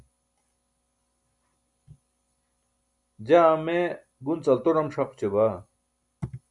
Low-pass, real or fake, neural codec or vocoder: 10.8 kHz; real; none